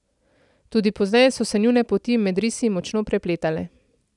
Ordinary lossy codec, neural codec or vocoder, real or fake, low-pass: none; none; real; 10.8 kHz